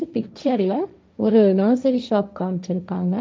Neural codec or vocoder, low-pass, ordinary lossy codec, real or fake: codec, 16 kHz, 1.1 kbps, Voila-Tokenizer; none; none; fake